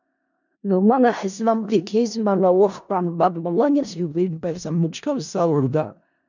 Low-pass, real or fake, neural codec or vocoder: 7.2 kHz; fake; codec, 16 kHz in and 24 kHz out, 0.4 kbps, LongCat-Audio-Codec, four codebook decoder